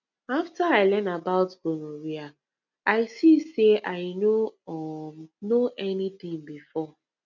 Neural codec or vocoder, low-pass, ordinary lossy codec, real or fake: none; 7.2 kHz; none; real